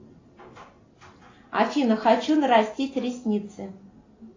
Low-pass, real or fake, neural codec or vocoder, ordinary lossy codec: 7.2 kHz; real; none; AAC, 32 kbps